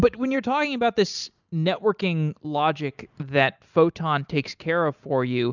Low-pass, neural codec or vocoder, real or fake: 7.2 kHz; none; real